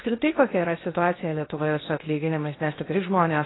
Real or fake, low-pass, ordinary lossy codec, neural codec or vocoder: fake; 7.2 kHz; AAC, 16 kbps; codec, 16 kHz in and 24 kHz out, 0.6 kbps, FocalCodec, streaming, 2048 codes